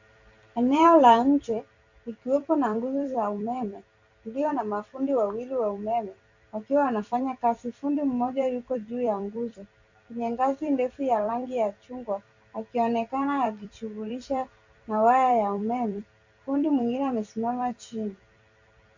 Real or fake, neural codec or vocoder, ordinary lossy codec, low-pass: fake; vocoder, 44.1 kHz, 128 mel bands every 256 samples, BigVGAN v2; Opus, 64 kbps; 7.2 kHz